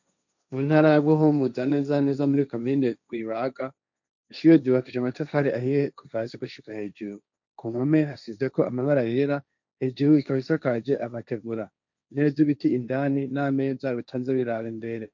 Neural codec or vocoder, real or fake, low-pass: codec, 16 kHz, 1.1 kbps, Voila-Tokenizer; fake; 7.2 kHz